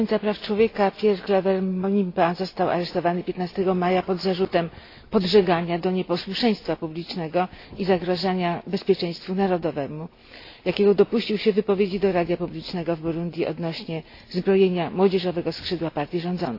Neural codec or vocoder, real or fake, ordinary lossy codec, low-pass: none; real; AAC, 32 kbps; 5.4 kHz